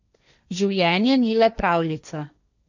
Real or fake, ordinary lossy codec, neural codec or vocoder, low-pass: fake; none; codec, 16 kHz, 1.1 kbps, Voila-Tokenizer; 7.2 kHz